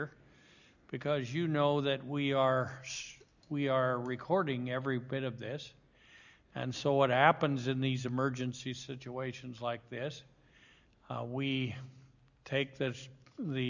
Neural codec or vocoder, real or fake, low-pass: none; real; 7.2 kHz